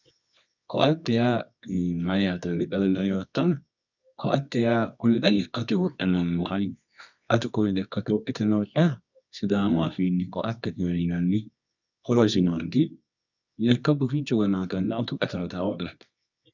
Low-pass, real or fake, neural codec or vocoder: 7.2 kHz; fake; codec, 24 kHz, 0.9 kbps, WavTokenizer, medium music audio release